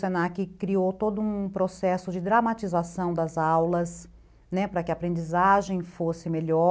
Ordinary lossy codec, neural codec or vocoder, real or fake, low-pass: none; none; real; none